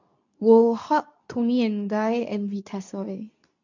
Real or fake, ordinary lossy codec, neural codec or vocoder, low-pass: fake; none; codec, 24 kHz, 0.9 kbps, WavTokenizer, medium speech release version 2; 7.2 kHz